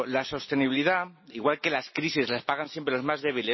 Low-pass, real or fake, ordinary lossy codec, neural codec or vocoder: 7.2 kHz; real; MP3, 24 kbps; none